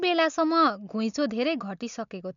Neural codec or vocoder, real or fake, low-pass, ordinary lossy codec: none; real; 7.2 kHz; none